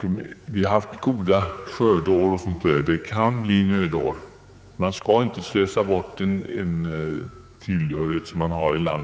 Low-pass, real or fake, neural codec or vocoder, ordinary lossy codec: none; fake; codec, 16 kHz, 4 kbps, X-Codec, HuBERT features, trained on general audio; none